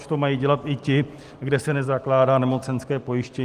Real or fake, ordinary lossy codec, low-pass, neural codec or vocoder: real; Opus, 24 kbps; 14.4 kHz; none